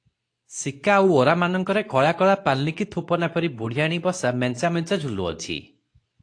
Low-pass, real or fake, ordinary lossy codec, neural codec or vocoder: 9.9 kHz; fake; AAC, 48 kbps; codec, 24 kHz, 0.9 kbps, WavTokenizer, medium speech release version 2